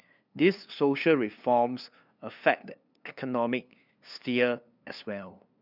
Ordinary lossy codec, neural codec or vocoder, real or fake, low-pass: none; codec, 16 kHz, 2 kbps, FunCodec, trained on LibriTTS, 25 frames a second; fake; 5.4 kHz